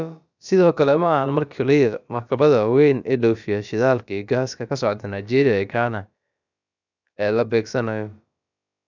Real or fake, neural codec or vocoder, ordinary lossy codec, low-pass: fake; codec, 16 kHz, about 1 kbps, DyCAST, with the encoder's durations; none; 7.2 kHz